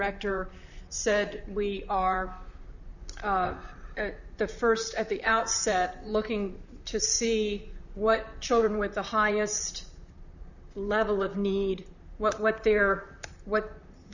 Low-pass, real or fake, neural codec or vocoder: 7.2 kHz; fake; vocoder, 44.1 kHz, 128 mel bands, Pupu-Vocoder